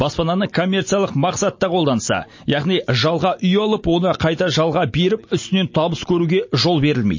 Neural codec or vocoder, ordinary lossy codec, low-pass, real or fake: none; MP3, 32 kbps; 7.2 kHz; real